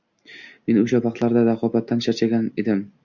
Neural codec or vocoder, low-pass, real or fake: none; 7.2 kHz; real